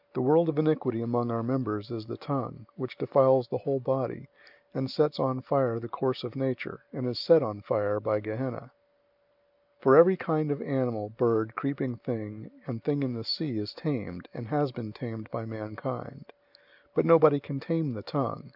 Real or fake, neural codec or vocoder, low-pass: real; none; 5.4 kHz